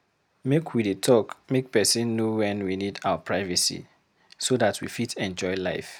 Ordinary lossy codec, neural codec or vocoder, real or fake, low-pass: none; none; real; none